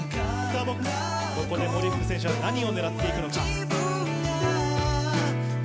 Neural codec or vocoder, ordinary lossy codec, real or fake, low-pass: none; none; real; none